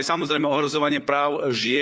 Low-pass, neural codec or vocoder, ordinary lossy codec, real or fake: none; codec, 16 kHz, 4 kbps, FunCodec, trained on LibriTTS, 50 frames a second; none; fake